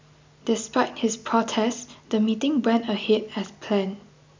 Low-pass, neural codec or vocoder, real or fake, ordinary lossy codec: 7.2 kHz; none; real; MP3, 64 kbps